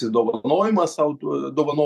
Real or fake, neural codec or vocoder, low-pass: fake; vocoder, 44.1 kHz, 128 mel bands every 512 samples, BigVGAN v2; 14.4 kHz